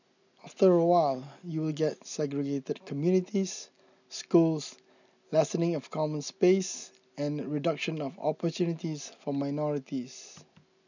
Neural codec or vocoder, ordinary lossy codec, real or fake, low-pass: none; none; real; 7.2 kHz